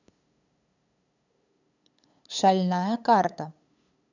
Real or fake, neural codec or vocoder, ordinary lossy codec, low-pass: fake; codec, 16 kHz, 8 kbps, FunCodec, trained on LibriTTS, 25 frames a second; none; 7.2 kHz